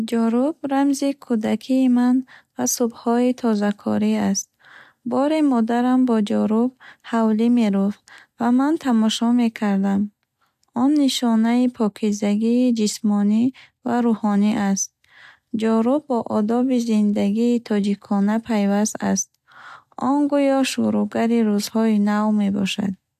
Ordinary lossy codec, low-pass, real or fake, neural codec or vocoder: AAC, 96 kbps; 14.4 kHz; real; none